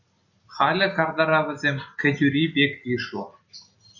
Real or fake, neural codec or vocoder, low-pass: real; none; 7.2 kHz